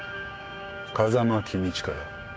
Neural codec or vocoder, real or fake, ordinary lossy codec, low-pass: codec, 16 kHz, 6 kbps, DAC; fake; none; none